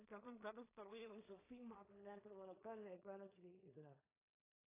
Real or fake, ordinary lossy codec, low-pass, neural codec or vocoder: fake; AAC, 16 kbps; 3.6 kHz; codec, 16 kHz in and 24 kHz out, 0.4 kbps, LongCat-Audio-Codec, two codebook decoder